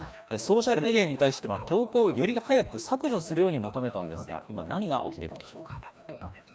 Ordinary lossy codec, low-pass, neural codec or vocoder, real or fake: none; none; codec, 16 kHz, 1 kbps, FreqCodec, larger model; fake